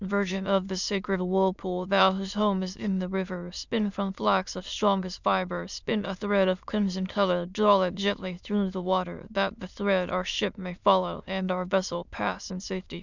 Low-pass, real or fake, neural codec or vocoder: 7.2 kHz; fake; autoencoder, 22.05 kHz, a latent of 192 numbers a frame, VITS, trained on many speakers